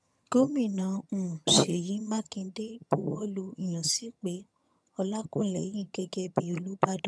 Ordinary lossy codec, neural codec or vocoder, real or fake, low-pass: none; vocoder, 22.05 kHz, 80 mel bands, HiFi-GAN; fake; none